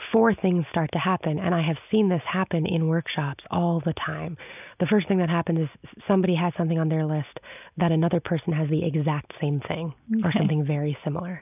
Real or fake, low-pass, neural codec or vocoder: real; 3.6 kHz; none